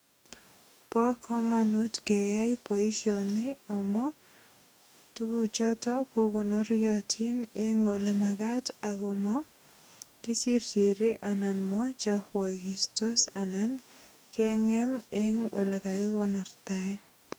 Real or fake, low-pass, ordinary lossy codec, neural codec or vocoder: fake; none; none; codec, 44.1 kHz, 2.6 kbps, DAC